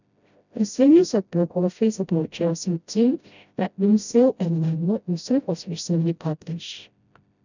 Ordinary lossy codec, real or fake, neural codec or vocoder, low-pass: none; fake; codec, 16 kHz, 0.5 kbps, FreqCodec, smaller model; 7.2 kHz